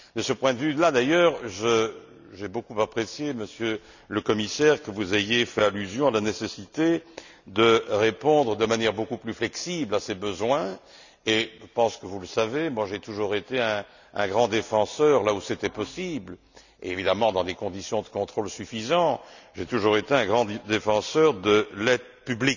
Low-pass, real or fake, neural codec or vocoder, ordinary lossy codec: 7.2 kHz; real; none; none